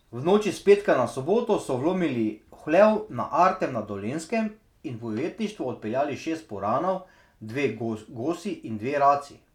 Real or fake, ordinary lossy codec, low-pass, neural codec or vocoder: real; none; 19.8 kHz; none